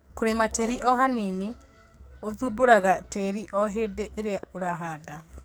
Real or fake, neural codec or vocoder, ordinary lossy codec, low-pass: fake; codec, 44.1 kHz, 2.6 kbps, SNAC; none; none